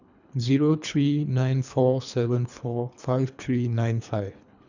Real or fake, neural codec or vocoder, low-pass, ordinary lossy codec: fake; codec, 24 kHz, 3 kbps, HILCodec; 7.2 kHz; none